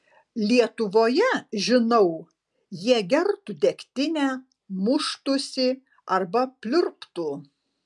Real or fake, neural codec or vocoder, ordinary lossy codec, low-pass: real; none; MP3, 96 kbps; 10.8 kHz